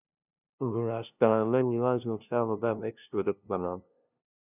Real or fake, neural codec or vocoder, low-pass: fake; codec, 16 kHz, 0.5 kbps, FunCodec, trained on LibriTTS, 25 frames a second; 3.6 kHz